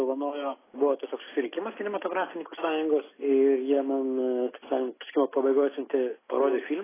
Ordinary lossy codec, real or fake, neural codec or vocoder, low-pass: AAC, 16 kbps; real; none; 3.6 kHz